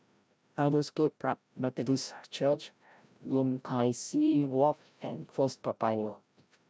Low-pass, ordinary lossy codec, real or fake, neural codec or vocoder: none; none; fake; codec, 16 kHz, 0.5 kbps, FreqCodec, larger model